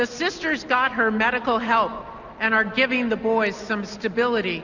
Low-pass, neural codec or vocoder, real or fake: 7.2 kHz; none; real